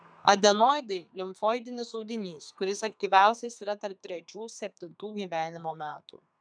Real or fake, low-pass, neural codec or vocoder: fake; 9.9 kHz; codec, 32 kHz, 1.9 kbps, SNAC